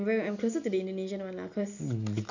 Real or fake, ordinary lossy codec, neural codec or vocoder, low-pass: real; none; none; 7.2 kHz